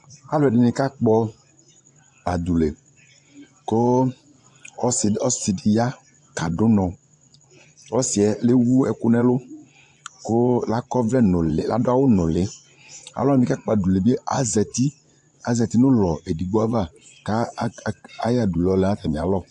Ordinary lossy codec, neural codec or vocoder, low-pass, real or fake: AAC, 64 kbps; vocoder, 24 kHz, 100 mel bands, Vocos; 10.8 kHz; fake